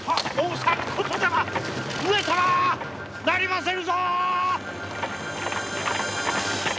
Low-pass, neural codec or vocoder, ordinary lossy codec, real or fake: none; none; none; real